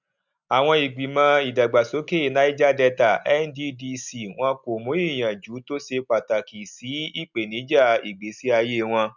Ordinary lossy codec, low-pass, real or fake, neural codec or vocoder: none; 7.2 kHz; real; none